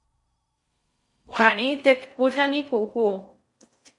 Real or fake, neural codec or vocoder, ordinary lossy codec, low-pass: fake; codec, 16 kHz in and 24 kHz out, 0.6 kbps, FocalCodec, streaming, 4096 codes; MP3, 48 kbps; 10.8 kHz